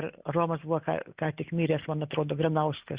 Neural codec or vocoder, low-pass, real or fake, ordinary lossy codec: none; 3.6 kHz; real; Opus, 64 kbps